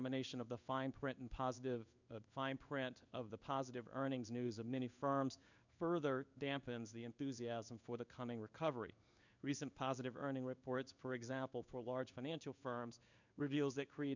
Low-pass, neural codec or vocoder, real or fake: 7.2 kHz; codec, 16 kHz in and 24 kHz out, 1 kbps, XY-Tokenizer; fake